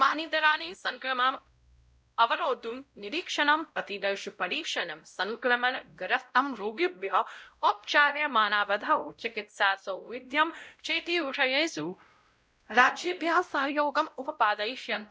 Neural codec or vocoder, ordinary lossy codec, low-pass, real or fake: codec, 16 kHz, 0.5 kbps, X-Codec, WavLM features, trained on Multilingual LibriSpeech; none; none; fake